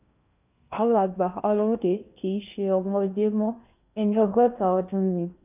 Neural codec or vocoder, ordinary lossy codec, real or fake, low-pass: codec, 16 kHz in and 24 kHz out, 0.8 kbps, FocalCodec, streaming, 65536 codes; none; fake; 3.6 kHz